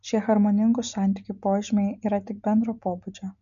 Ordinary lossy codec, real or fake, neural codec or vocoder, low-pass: MP3, 96 kbps; real; none; 7.2 kHz